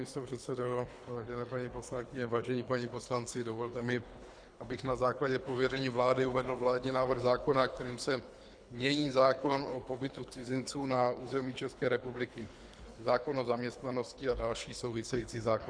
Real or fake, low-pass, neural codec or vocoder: fake; 9.9 kHz; codec, 24 kHz, 3 kbps, HILCodec